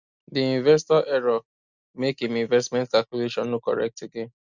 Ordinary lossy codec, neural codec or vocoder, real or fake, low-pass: Opus, 64 kbps; none; real; 7.2 kHz